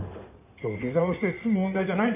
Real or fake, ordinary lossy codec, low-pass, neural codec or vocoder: fake; none; 3.6 kHz; codec, 16 kHz in and 24 kHz out, 2.2 kbps, FireRedTTS-2 codec